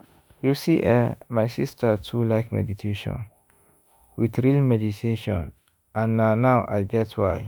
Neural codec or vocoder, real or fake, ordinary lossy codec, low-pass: autoencoder, 48 kHz, 32 numbers a frame, DAC-VAE, trained on Japanese speech; fake; none; none